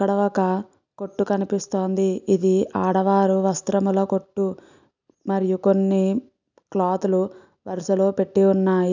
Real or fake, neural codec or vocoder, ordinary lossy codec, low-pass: real; none; none; 7.2 kHz